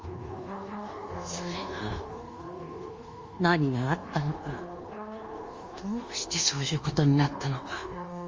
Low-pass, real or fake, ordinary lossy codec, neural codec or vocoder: 7.2 kHz; fake; Opus, 32 kbps; codec, 24 kHz, 1.2 kbps, DualCodec